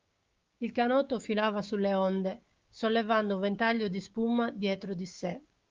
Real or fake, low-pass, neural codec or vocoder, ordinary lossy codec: fake; 7.2 kHz; codec, 16 kHz, 6 kbps, DAC; Opus, 32 kbps